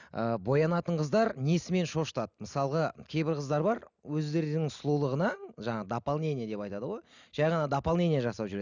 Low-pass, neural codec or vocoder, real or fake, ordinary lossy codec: 7.2 kHz; none; real; none